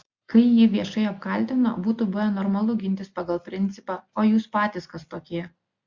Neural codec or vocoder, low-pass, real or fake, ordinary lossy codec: none; 7.2 kHz; real; Opus, 64 kbps